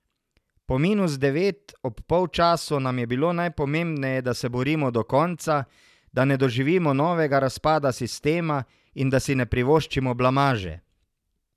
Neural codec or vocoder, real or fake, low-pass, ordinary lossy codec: none; real; 14.4 kHz; none